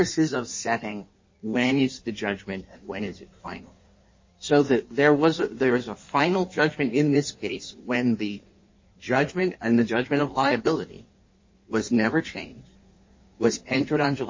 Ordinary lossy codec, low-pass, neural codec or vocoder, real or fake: MP3, 32 kbps; 7.2 kHz; codec, 16 kHz in and 24 kHz out, 1.1 kbps, FireRedTTS-2 codec; fake